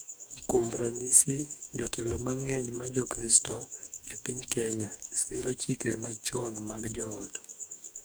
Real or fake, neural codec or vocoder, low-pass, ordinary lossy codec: fake; codec, 44.1 kHz, 2.6 kbps, DAC; none; none